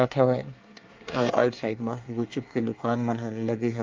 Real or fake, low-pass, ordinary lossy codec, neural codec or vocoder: fake; 7.2 kHz; Opus, 24 kbps; codec, 24 kHz, 1 kbps, SNAC